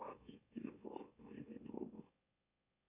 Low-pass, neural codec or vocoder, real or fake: 3.6 kHz; autoencoder, 44.1 kHz, a latent of 192 numbers a frame, MeloTTS; fake